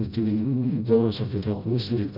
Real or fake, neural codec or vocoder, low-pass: fake; codec, 16 kHz, 0.5 kbps, FreqCodec, smaller model; 5.4 kHz